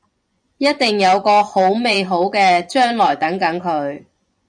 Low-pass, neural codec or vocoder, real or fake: 9.9 kHz; vocoder, 24 kHz, 100 mel bands, Vocos; fake